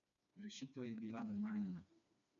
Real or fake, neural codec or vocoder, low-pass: fake; codec, 16 kHz, 2 kbps, FreqCodec, smaller model; 7.2 kHz